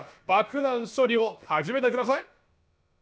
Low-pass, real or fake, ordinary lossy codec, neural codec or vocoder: none; fake; none; codec, 16 kHz, about 1 kbps, DyCAST, with the encoder's durations